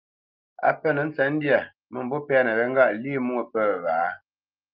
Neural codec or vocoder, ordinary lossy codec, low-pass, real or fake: none; Opus, 24 kbps; 5.4 kHz; real